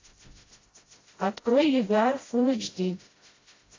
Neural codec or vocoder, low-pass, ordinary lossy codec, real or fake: codec, 16 kHz, 0.5 kbps, FreqCodec, smaller model; 7.2 kHz; AAC, 32 kbps; fake